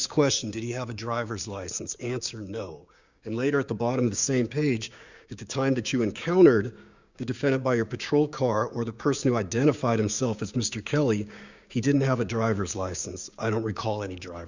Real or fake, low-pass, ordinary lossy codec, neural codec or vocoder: fake; 7.2 kHz; Opus, 64 kbps; codec, 16 kHz, 6 kbps, DAC